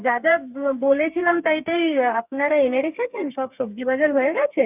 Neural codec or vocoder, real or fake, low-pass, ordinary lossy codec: codec, 32 kHz, 1.9 kbps, SNAC; fake; 3.6 kHz; none